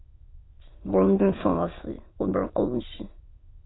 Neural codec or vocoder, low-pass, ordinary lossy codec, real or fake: autoencoder, 22.05 kHz, a latent of 192 numbers a frame, VITS, trained on many speakers; 7.2 kHz; AAC, 16 kbps; fake